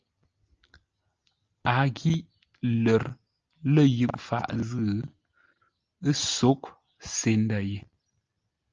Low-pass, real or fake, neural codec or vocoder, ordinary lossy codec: 7.2 kHz; real; none; Opus, 24 kbps